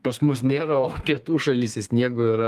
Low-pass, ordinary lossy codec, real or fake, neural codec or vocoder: 14.4 kHz; Opus, 32 kbps; fake; codec, 32 kHz, 1.9 kbps, SNAC